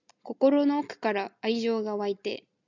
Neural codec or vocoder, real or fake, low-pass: none; real; 7.2 kHz